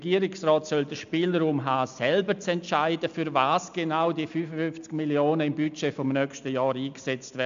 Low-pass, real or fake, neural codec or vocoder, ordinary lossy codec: 7.2 kHz; real; none; MP3, 64 kbps